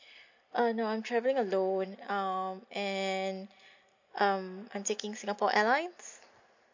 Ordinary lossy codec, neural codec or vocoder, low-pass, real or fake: MP3, 48 kbps; none; 7.2 kHz; real